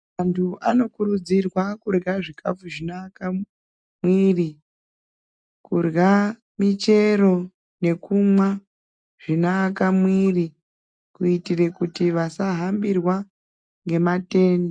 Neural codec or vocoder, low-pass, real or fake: none; 9.9 kHz; real